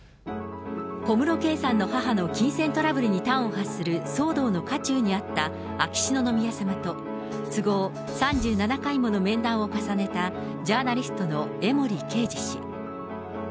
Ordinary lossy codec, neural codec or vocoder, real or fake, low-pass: none; none; real; none